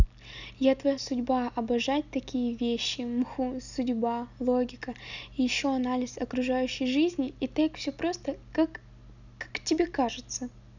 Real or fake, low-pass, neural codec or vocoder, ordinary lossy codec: real; 7.2 kHz; none; none